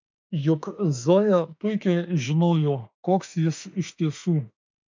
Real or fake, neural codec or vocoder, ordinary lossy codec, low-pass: fake; autoencoder, 48 kHz, 32 numbers a frame, DAC-VAE, trained on Japanese speech; MP3, 64 kbps; 7.2 kHz